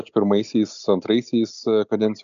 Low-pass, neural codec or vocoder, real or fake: 7.2 kHz; none; real